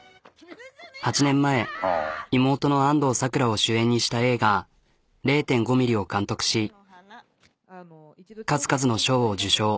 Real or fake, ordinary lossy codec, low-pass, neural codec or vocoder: real; none; none; none